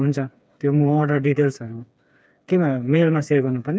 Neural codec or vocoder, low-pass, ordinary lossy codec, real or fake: codec, 16 kHz, 4 kbps, FreqCodec, smaller model; none; none; fake